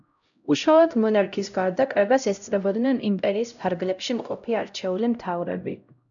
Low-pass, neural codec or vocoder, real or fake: 7.2 kHz; codec, 16 kHz, 0.5 kbps, X-Codec, HuBERT features, trained on LibriSpeech; fake